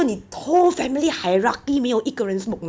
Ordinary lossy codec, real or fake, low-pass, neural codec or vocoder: none; real; none; none